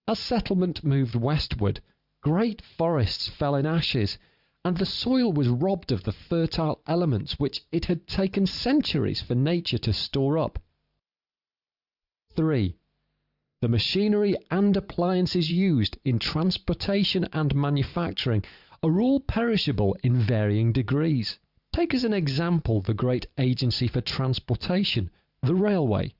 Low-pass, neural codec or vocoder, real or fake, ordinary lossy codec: 5.4 kHz; vocoder, 44.1 kHz, 128 mel bands every 512 samples, BigVGAN v2; fake; Opus, 64 kbps